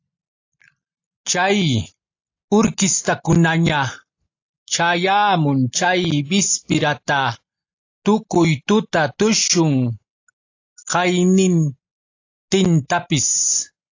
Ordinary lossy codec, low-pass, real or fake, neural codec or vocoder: AAC, 48 kbps; 7.2 kHz; real; none